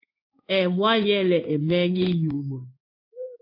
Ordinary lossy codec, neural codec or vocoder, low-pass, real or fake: AAC, 32 kbps; codec, 16 kHz in and 24 kHz out, 1 kbps, XY-Tokenizer; 5.4 kHz; fake